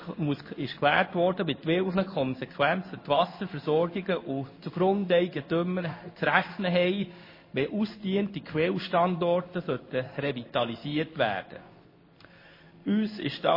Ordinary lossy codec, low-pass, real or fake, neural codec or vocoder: MP3, 24 kbps; 5.4 kHz; fake; codec, 16 kHz in and 24 kHz out, 1 kbps, XY-Tokenizer